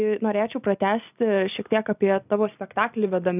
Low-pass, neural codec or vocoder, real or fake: 3.6 kHz; none; real